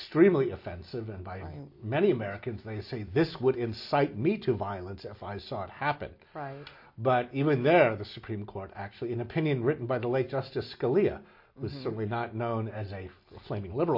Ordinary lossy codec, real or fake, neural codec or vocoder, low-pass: MP3, 32 kbps; real; none; 5.4 kHz